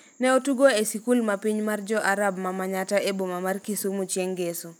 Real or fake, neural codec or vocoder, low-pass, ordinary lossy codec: real; none; none; none